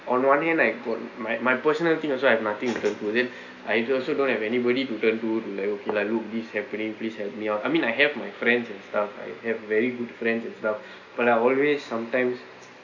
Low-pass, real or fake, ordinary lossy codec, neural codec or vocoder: 7.2 kHz; real; none; none